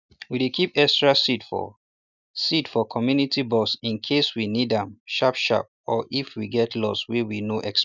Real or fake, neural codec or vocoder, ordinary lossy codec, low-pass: real; none; none; 7.2 kHz